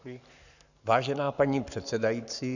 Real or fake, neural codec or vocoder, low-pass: fake; codec, 44.1 kHz, 7.8 kbps, Pupu-Codec; 7.2 kHz